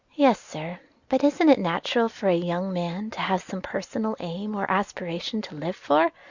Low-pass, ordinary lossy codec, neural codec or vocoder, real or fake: 7.2 kHz; Opus, 64 kbps; none; real